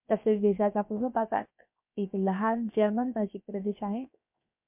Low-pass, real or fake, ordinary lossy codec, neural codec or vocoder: 3.6 kHz; fake; MP3, 32 kbps; codec, 16 kHz, 0.7 kbps, FocalCodec